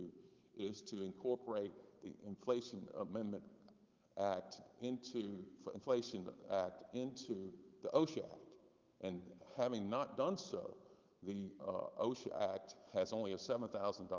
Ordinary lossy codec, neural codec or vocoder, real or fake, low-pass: Opus, 24 kbps; codec, 16 kHz, 8 kbps, FunCodec, trained on Chinese and English, 25 frames a second; fake; 7.2 kHz